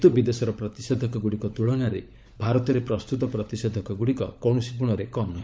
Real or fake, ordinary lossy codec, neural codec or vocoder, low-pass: fake; none; codec, 16 kHz, 16 kbps, FunCodec, trained on LibriTTS, 50 frames a second; none